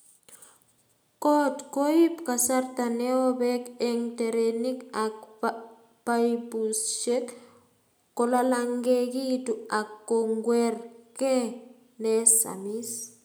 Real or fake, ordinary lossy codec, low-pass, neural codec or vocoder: real; none; none; none